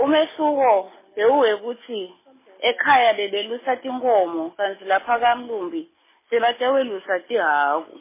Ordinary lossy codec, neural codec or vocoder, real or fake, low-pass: MP3, 16 kbps; none; real; 3.6 kHz